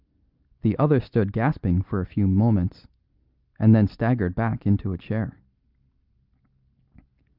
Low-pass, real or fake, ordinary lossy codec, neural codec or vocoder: 5.4 kHz; real; Opus, 24 kbps; none